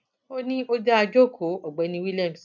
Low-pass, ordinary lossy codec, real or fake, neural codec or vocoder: 7.2 kHz; none; real; none